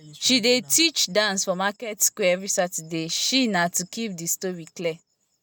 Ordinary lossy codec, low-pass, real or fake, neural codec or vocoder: none; none; real; none